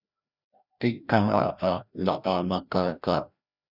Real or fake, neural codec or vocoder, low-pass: fake; codec, 16 kHz, 1 kbps, FreqCodec, larger model; 5.4 kHz